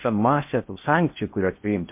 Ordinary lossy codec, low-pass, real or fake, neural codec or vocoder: MP3, 32 kbps; 3.6 kHz; fake; codec, 16 kHz in and 24 kHz out, 0.6 kbps, FocalCodec, streaming, 2048 codes